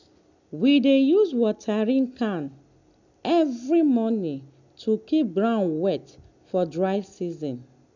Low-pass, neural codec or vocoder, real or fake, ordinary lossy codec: 7.2 kHz; none; real; none